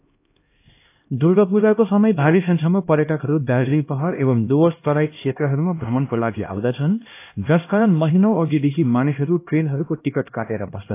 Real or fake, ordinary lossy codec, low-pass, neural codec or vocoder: fake; AAC, 24 kbps; 3.6 kHz; codec, 16 kHz, 1 kbps, X-Codec, HuBERT features, trained on LibriSpeech